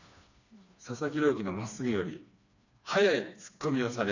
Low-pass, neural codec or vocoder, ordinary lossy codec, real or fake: 7.2 kHz; codec, 16 kHz, 2 kbps, FreqCodec, smaller model; none; fake